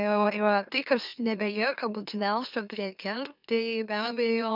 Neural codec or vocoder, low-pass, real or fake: autoencoder, 44.1 kHz, a latent of 192 numbers a frame, MeloTTS; 5.4 kHz; fake